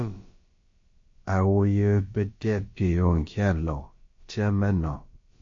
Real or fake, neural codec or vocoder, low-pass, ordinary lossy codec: fake; codec, 16 kHz, about 1 kbps, DyCAST, with the encoder's durations; 7.2 kHz; MP3, 32 kbps